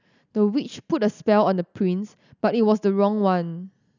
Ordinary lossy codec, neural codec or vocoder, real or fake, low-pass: none; none; real; 7.2 kHz